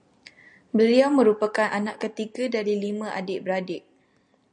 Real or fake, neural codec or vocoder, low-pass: real; none; 9.9 kHz